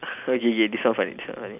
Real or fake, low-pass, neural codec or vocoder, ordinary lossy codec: real; 3.6 kHz; none; none